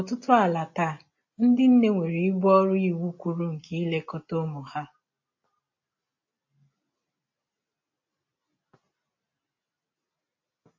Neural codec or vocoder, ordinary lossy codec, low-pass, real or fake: none; MP3, 32 kbps; 7.2 kHz; real